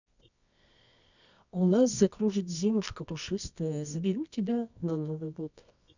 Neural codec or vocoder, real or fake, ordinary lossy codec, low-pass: codec, 24 kHz, 0.9 kbps, WavTokenizer, medium music audio release; fake; none; 7.2 kHz